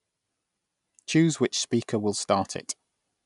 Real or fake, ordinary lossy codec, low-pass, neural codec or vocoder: real; none; 10.8 kHz; none